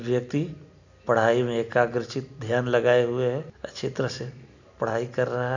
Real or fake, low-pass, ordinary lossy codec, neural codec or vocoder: real; 7.2 kHz; none; none